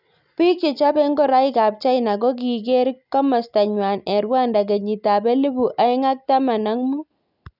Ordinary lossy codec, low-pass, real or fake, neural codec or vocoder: none; 5.4 kHz; real; none